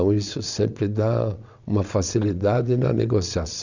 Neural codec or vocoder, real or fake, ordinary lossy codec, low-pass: vocoder, 22.05 kHz, 80 mel bands, WaveNeXt; fake; none; 7.2 kHz